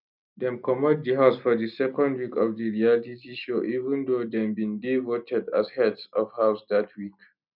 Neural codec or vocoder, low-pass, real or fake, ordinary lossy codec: none; 5.4 kHz; real; none